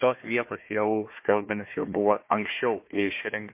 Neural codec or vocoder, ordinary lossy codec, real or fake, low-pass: codec, 16 kHz, 1 kbps, FunCodec, trained on Chinese and English, 50 frames a second; MP3, 24 kbps; fake; 3.6 kHz